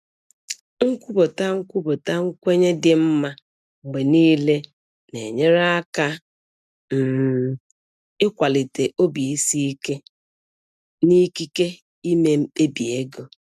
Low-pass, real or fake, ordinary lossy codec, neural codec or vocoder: 14.4 kHz; real; none; none